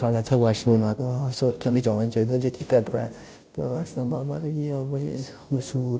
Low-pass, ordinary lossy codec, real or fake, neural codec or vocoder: none; none; fake; codec, 16 kHz, 0.5 kbps, FunCodec, trained on Chinese and English, 25 frames a second